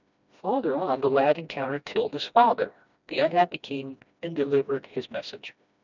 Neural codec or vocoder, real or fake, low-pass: codec, 16 kHz, 1 kbps, FreqCodec, smaller model; fake; 7.2 kHz